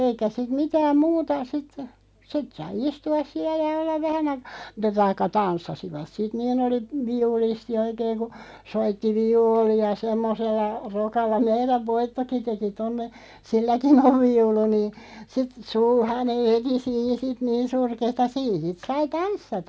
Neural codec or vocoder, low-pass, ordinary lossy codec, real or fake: none; none; none; real